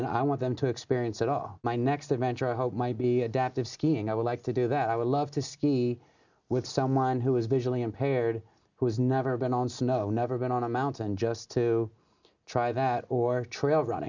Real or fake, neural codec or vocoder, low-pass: real; none; 7.2 kHz